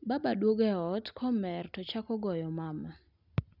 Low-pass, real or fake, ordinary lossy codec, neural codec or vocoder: 5.4 kHz; real; none; none